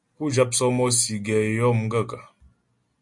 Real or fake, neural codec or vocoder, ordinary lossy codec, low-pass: real; none; MP3, 48 kbps; 10.8 kHz